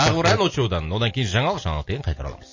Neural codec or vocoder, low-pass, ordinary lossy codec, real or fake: none; 7.2 kHz; MP3, 32 kbps; real